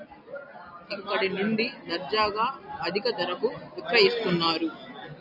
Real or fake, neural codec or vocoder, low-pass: real; none; 5.4 kHz